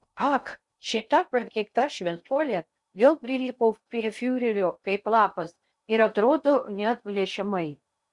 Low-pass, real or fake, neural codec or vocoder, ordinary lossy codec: 10.8 kHz; fake; codec, 16 kHz in and 24 kHz out, 0.6 kbps, FocalCodec, streaming, 2048 codes; Opus, 64 kbps